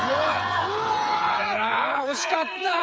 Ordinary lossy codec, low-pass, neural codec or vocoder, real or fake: none; none; codec, 16 kHz, 8 kbps, FreqCodec, smaller model; fake